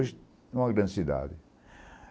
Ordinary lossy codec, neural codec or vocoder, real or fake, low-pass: none; none; real; none